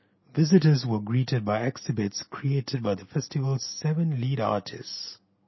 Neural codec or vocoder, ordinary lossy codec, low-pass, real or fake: none; MP3, 24 kbps; 7.2 kHz; real